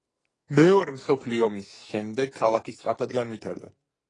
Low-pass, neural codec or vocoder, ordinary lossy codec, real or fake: 10.8 kHz; codec, 44.1 kHz, 2.6 kbps, SNAC; AAC, 32 kbps; fake